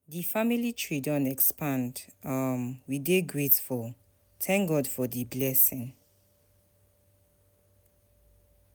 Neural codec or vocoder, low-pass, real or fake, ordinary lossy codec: none; none; real; none